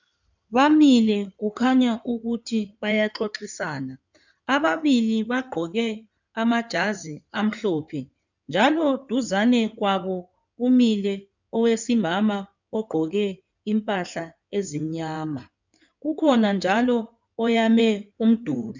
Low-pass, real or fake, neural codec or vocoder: 7.2 kHz; fake; codec, 16 kHz in and 24 kHz out, 2.2 kbps, FireRedTTS-2 codec